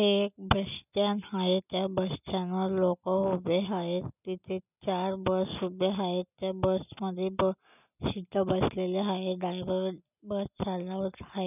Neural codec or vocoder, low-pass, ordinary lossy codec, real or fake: none; 3.6 kHz; none; real